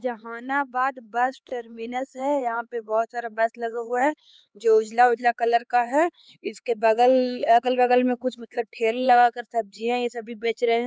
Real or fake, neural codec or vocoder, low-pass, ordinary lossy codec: fake; codec, 16 kHz, 4 kbps, X-Codec, HuBERT features, trained on LibriSpeech; none; none